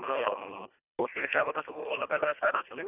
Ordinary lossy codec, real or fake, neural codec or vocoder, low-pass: none; fake; codec, 24 kHz, 1.5 kbps, HILCodec; 3.6 kHz